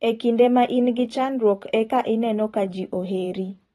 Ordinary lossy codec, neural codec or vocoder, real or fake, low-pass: AAC, 32 kbps; vocoder, 44.1 kHz, 128 mel bands every 512 samples, BigVGAN v2; fake; 19.8 kHz